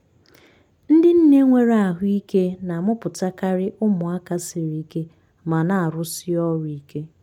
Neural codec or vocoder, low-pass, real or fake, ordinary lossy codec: none; 19.8 kHz; real; MP3, 96 kbps